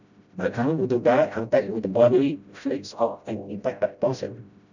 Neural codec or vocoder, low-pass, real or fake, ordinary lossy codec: codec, 16 kHz, 0.5 kbps, FreqCodec, smaller model; 7.2 kHz; fake; none